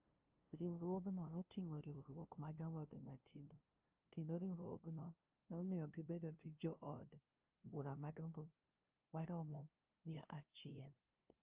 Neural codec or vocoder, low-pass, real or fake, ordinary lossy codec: codec, 16 kHz, 0.5 kbps, FunCodec, trained on LibriTTS, 25 frames a second; 3.6 kHz; fake; Opus, 32 kbps